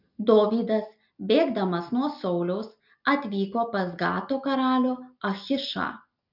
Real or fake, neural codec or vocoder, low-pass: real; none; 5.4 kHz